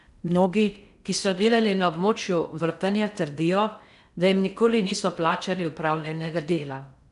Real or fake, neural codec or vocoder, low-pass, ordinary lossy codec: fake; codec, 16 kHz in and 24 kHz out, 0.6 kbps, FocalCodec, streaming, 4096 codes; 10.8 kHz; none